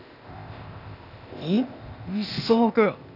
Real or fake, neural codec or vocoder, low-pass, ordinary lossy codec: fake; codec, 16 kHz, 0.8 kbps, ZipCodec; 5.4 kHz; none